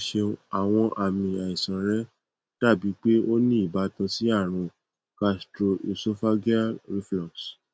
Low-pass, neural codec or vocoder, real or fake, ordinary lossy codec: none; none; real; none